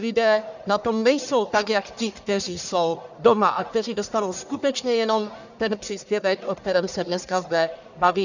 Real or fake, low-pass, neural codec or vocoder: fake; 7.2 kHz; codec, 44.1 kHz, 1.7 kbps, Pupu-Codec